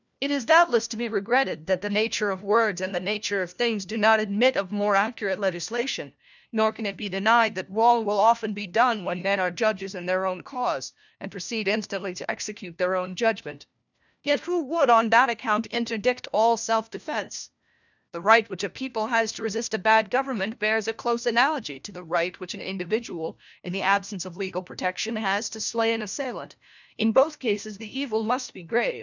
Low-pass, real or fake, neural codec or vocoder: 7.2 kHz; fake; codec, 16 kHz, 1 kbps, FunCodec, trained on LibriTTS, 50 frames a second